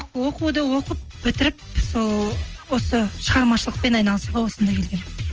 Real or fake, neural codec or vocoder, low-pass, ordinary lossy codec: real; none; 7.2 kHz; Opus, 24 kbps